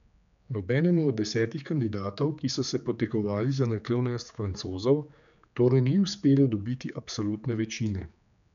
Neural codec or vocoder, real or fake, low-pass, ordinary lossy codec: codec, 16 kHz, 4 kbps, X-Codec, HuBERT features, trained on general audio; fake; 7.2 kHz; none